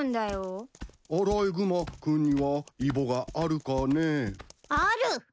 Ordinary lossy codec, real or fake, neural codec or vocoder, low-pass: none; real; none; none